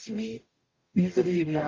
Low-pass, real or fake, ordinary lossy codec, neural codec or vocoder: 7.2 kHz; fake; Opus, 32 kbps; codec, 44.1 kHz, 0.9 kbps, DAC